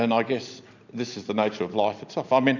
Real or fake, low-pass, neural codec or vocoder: real; 7.2 kHz; none